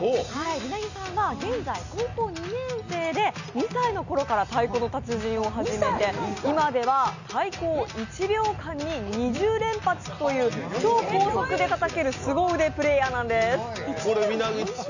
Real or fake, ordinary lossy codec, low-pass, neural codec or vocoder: real; none; 7.2 kHz; none